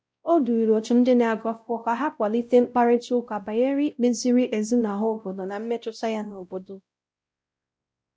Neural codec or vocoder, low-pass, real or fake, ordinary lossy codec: codec, 16 kHz, 0.5 kbps, X-Codec, WavLM features, trained on Multilingual LibriSpeech; none; fake; none